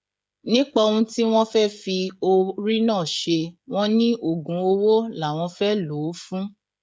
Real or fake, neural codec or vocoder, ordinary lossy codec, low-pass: fake; codec, 16 kHz, 16 kbps, FreqCodec, smaller model; none; none